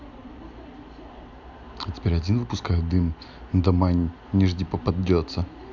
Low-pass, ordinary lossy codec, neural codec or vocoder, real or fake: 7.2 kHz; none; none; real